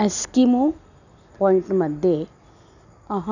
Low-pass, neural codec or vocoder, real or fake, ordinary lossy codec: 7.2 kHz; none; real; none